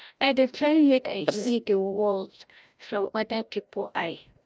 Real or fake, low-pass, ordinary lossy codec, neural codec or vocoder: fake; none; none; codec, 16 kHz, 0.5 kbps, FreqCodec, larger model